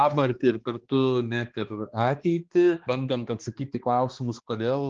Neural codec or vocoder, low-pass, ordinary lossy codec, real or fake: codec, 16 kHz, 2 kbps, X-Codec, HuBERT features, trained on balanced general audio; 7.2 kHz; Opus, 16 kbps; fake